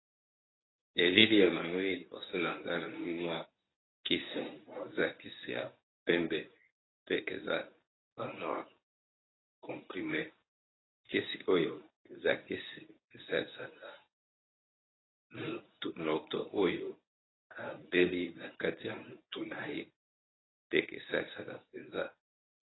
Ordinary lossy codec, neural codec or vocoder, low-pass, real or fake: AAC, 16 kbps; codec, 24 kHz, 0.9 kbps, WavTokenizer, medium speech release version 1; 7.2 kHz; fake